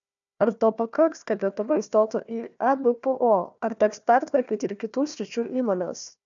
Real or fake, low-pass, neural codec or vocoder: fake; 7.2 kHz; codec, 16 kHz, 1 kbps, FunCodec, trained on Chinese and English, 50 frames a second